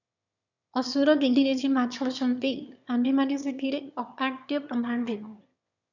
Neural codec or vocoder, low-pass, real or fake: autoencoder, 22.05 kHz, a latent of 192 numbers a frame, VITS, trained on one speaker; 7.2 kHz; fake